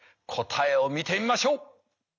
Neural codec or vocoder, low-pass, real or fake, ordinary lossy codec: none; 7.2 kHz; real; none